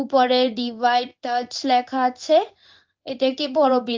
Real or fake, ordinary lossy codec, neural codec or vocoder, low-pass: fake; Opus, 32 kbps; codec, 16 kHz in and 24 kHz out, 1 kbps, XY-Tokenizer; 7.2 kHz